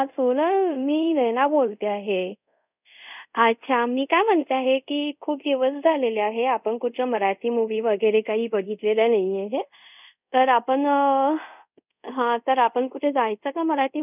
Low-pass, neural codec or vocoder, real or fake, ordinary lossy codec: 3.6 kHz; codec, 24 kHz, 0.5 kbps, DualCodec; fake; none